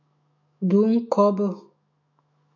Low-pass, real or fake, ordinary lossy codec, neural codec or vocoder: 7.2 kHz; fake; AAC, 48 kbps; autoencoder, 48 kHz, 128 numbers a frame, DAC-VAE, trained on Japanese speech